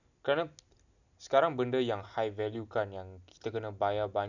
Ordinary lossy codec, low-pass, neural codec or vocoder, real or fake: none; 7.2 kHz; none; real